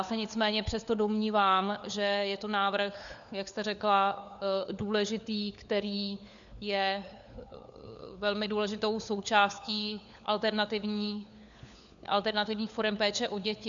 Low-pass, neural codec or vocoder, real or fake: 7.2 kHz; codec, 16 kHz, 4 kbps, FunCodec, trained on LibriTTS, 50 frames a second; fake